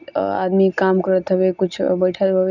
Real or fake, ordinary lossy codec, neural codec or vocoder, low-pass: real; none; none; 7.2 kHz